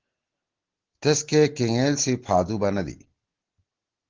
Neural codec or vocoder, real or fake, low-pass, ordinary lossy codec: none; real; 7.2 kHz; Opus, 16 kbps